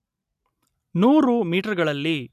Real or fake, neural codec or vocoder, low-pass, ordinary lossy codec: real; none; 14.4 kHz; none